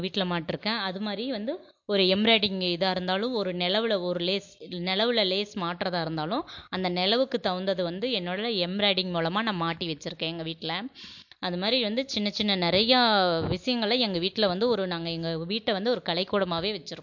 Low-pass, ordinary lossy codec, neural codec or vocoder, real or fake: 7.2 kHz; MP3, 48 kbps; none; real